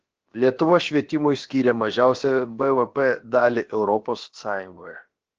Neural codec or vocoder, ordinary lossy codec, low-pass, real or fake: codec, 16 kHz, about 1 kbps, DyCAST, with the encoder's durations; Opus, 16 kbps; 7.2 kHz; fake